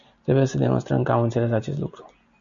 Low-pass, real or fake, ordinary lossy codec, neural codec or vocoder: 7.2 kHz; real; MP3, 96 kbps; none